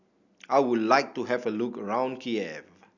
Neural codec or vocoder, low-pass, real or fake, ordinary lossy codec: none; 7.2 kHz; real; none